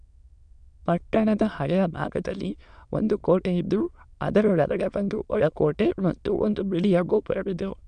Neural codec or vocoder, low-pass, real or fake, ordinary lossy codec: autoencoder, 22.05 kHz, a latent of 192 numbers a frame, VITS, trained on many speakers; 9.9 kHz; fake; none